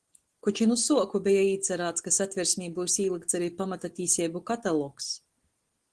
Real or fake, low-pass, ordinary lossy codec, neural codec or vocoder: real; 10.8 kHz; Opus, 16 kbps; none